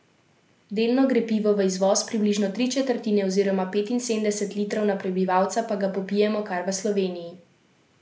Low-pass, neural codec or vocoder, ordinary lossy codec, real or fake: none; none; none; real